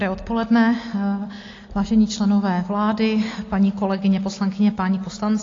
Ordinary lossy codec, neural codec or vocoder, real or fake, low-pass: AAC, 32 kbps; none; real; 7.2 kHz